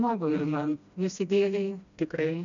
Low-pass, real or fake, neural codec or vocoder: 7.2 kHz; fake; codec, 16 kHz, 1 kbps, FreqCodec, smaller model